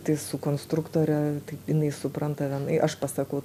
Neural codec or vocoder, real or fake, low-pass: none; real; 14.4 kHz